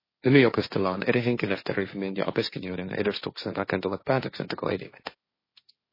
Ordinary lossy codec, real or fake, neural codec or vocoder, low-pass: MP3, 24 kbps; fake; codec, 16 kHz, 1.1 kbps, Voila-Tokenizer; 5.4 kHz